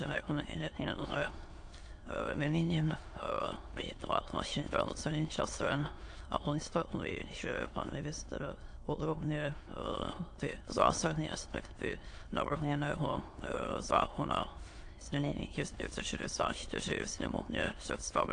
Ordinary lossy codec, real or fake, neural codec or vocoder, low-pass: AAC, 48 kbps; fake; autoencoder, 22.05 kHz, a latent of 192 numbers a frame, VITS, trained on many speakers; 9.9 kHz